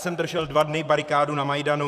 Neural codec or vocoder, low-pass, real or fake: vocoder, 44.1 kHz, 128 mel bands, Pupu-Vocoder; 14.4 kHz; fake